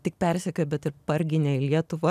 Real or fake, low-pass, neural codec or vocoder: real; 14.4 kHz; none